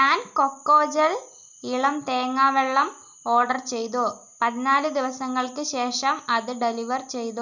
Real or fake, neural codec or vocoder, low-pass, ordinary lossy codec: real; none; 7.2 kHz; none